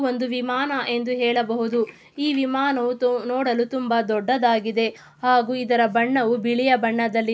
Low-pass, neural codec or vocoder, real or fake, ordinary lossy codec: none; none; real; none